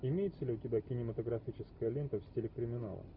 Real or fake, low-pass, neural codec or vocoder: real; 5.4 kHz; none